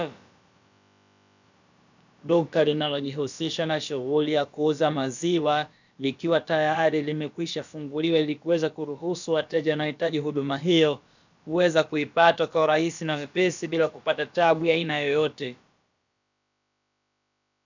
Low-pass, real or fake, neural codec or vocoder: 7.2 kHz; fake; codec, 16 kHz, about 1 kbps, DyCAST, with the encoder's durations